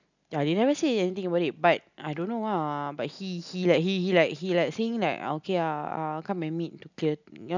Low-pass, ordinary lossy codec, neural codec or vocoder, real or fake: 7.2 kHz; none; none; real